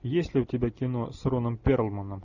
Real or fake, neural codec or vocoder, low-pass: real; none; 7.2 kHz